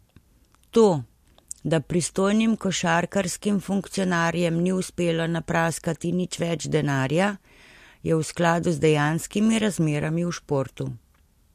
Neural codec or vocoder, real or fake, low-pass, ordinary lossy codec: none; real; 14.4 kHz; MP3, 64 kbps